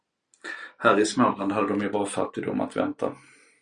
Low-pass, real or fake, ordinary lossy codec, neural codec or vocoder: 9.9 kHz; real; Opus, 64 kbps; none